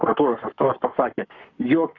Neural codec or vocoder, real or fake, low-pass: codec, 44.1 kHz, 7.8 kbps, Pupu-Codec; fake; 7.2 kHz